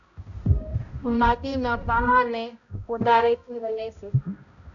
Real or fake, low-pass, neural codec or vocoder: fake; 7.2 kHz; codec, 16 kHz, 0.5 kbps, X-Codec, HuBERT features, trained on balanced general audio